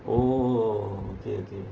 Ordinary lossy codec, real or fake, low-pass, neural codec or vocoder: Opus, 16 kbps; real; 7.2 kHz; none